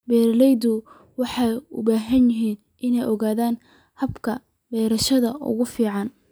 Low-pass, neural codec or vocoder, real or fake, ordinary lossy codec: none; none; real; none